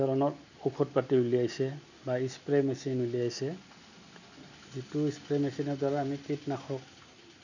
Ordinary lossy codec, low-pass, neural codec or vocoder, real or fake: none; 7.2 kHz; none; real